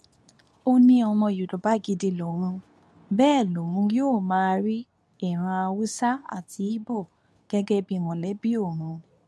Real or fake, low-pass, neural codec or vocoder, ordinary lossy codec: fake; none; codec, 24 kHz, 0.9 kbps, WavTokenizer, medium speech release version 2; none